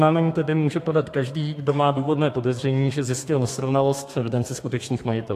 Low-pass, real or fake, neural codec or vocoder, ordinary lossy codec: 14.4 kHz; fake; codec, 32 kHz, 1.9 kbps, SNAC; AAC, 64 kbps